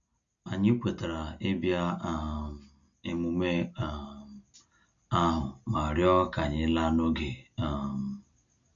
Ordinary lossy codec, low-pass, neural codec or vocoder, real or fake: none; 7.2 kHz; none; real